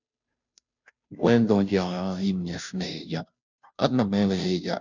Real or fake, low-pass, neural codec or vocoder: fake; 7.2 kHz; codec, 16 kHz, 0.5 kbps, FunCodec, trained on Chinese and English, 25 frames a second